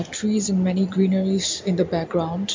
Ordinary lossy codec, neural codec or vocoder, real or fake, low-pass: MP3, 48 kbps; none; real; 7.2 kHz